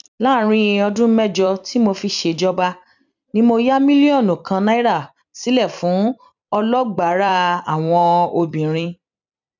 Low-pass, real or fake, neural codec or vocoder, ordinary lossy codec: 7.2 kHz; fake; vocoder, 44.1 kHz, 128 mel bands every 256 samples, BigVGAN v2; none